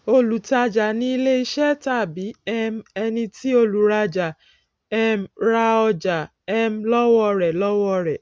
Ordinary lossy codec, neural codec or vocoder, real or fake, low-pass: none; none; real; none